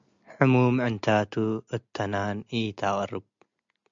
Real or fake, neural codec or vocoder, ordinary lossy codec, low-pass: real; none; MP3, 64 kbps; 7.2 kHz